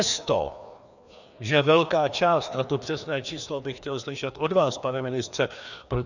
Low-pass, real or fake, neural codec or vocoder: 7.2 kHz; fake; codec, 16 kHz, 2 kbps, FreqCodec, larger model